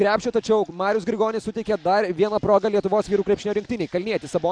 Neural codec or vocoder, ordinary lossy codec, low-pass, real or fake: none; MP3, 96 kbps; 9.9 kHz; real